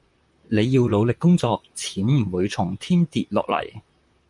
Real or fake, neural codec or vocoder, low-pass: fake; vocoder, 44.1 kHz, 128 mel bands, Pupu-Vocoder; 10.8 kHz